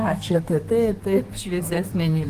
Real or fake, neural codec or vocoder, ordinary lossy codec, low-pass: fake; codec, 32 kHz, 1.9 kbps, SNAC; Opus, 24 kbps; 14.4 kHz